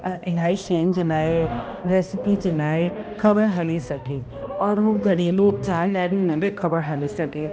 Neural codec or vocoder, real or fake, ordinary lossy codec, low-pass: codec, 16 kHz, 1 kbps, X-Codec, HuBERT features, trained on balanced general audio; fake; none; none